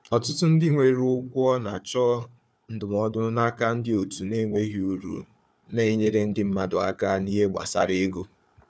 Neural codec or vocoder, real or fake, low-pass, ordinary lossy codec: codec, 16 kHz, 4 kbps, FunCodec, trained on Chinese and English, 50 frames a second; fake; none; none